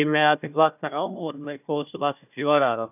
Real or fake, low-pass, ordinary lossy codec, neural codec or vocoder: fake; 3.6 kHz; none; codec, 16 kHz, 1 kbps, FunCodec, trained on Chinese and English, 50 frames a second